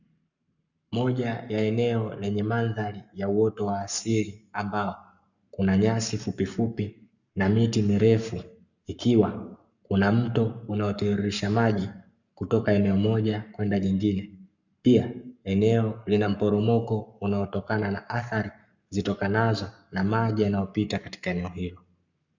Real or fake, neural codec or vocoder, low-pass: fake; codec, 44.1 kHz, 7.8 kbps, Pupu-Codec; 7.2 kHz